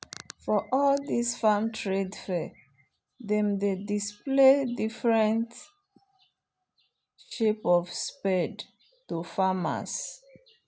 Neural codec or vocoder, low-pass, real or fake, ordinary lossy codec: none; none; real; none